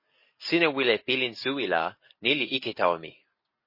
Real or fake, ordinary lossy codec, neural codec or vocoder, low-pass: real; MP3, 24 kbps; none; 5.4 kHz